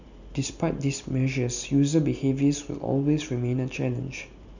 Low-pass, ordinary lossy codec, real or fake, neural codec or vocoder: 7.2 kHz; none; real; none